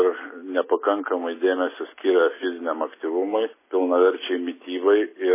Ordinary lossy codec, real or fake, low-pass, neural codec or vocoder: MP3, 16 kbps; real; 3.6 kHz; none